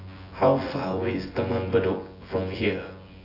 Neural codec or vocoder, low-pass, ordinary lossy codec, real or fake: vocoder, 24 kHz, 100 mel bands, Vocos; 5.4 kHz; AAC, 24 kbps; fake